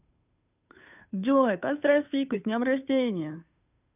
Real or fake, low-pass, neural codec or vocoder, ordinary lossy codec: fake; 3.6 kHz; codec, 16 kHz, 2 kbps, FunCodec, trained on Chinese and English, 25 frames a second; none